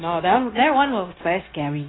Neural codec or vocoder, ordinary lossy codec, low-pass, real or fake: codec, 16 kHz, 0.9 kbps, LongCat-Audio-Codec; AAC, 16 kbps; 7.2 kHz; fake